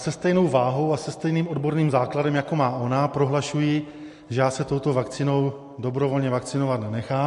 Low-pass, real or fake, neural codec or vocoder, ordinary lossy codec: 14.4 kHz; real; none; MP3, 48 kbps